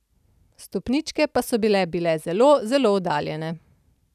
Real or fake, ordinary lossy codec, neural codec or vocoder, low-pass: real; none; none; 14.4 kHz